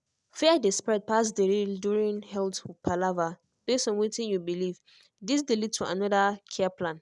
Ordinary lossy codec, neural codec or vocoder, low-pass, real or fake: none; none; 10.8 kHz; real